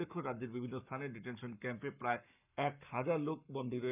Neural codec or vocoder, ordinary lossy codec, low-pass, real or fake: codec, 44.1 kHz, 7.8 kbps, Pupu-Codec; none; 3.6 kHz; fake